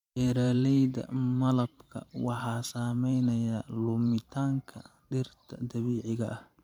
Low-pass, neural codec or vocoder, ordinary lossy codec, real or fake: 19.8 kHz; vocoder, 44.1 kHz, 128 mel bands every 512 samples, BigVGAN v2; MP3, 96 kbps; fake